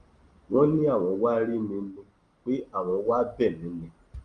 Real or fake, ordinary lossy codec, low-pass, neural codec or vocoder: real; Opus, 24 kbps; 9.9 kHz; none